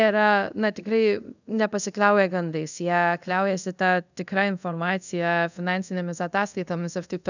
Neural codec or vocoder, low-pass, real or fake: codec, 24 kHz, 0.5 kbps, DualCodec; 7.2 kHz; fake